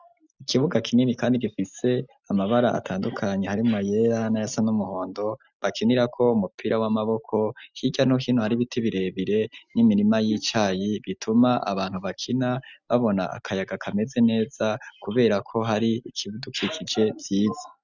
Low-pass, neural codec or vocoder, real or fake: 7.2 kHz; none; real